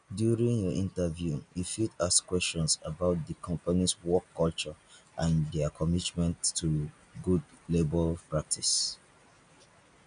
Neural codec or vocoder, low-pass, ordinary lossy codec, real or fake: none; 9.9 kHz; none; real